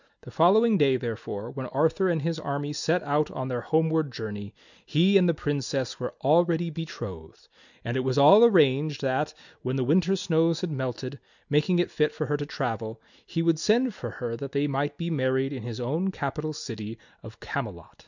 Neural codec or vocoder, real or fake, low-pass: none; real; 7.2 kHz